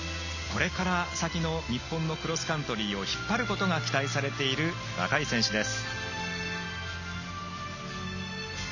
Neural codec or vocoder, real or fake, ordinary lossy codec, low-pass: none; real; none; 7.2 kHz